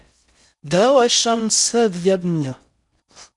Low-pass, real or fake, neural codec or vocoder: 10.8 kHz; fake; codec, 16 kHz in and 24 kHz out, 0.6 kbps, FocalCodec, streaming, 4096 codes